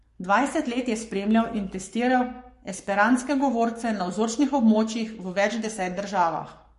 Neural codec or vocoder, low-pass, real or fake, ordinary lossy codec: codec, 44.1 kHz, 7.8 kbps, Pupu-Codec; 14.4 kHz; fake; MP3, 48 kbps